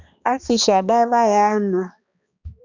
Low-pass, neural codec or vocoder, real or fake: 7.2 kHz; codec, 16 kHz, 2 kbps, X-Codec, HuBERT features, trained on balanced general audio; fake